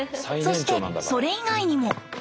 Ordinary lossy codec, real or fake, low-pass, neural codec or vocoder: none; real; none; none